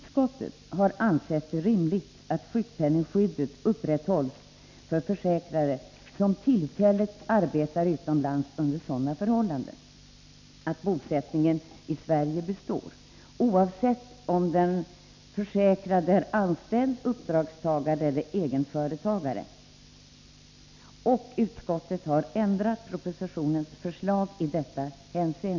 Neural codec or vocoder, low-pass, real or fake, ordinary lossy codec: none; 7.2 kHz; real; MP3, 64 kbps